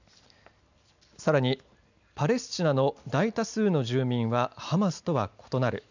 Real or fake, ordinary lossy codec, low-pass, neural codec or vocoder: real; none; 7.2 kHz; none